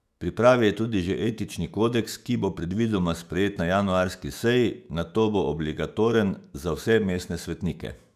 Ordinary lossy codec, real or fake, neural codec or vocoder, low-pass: none; fake; autoencoder, 48 kHz, 128 numbers a frame, DAC-VAE, trained on Japanese speech; 14.4 kHz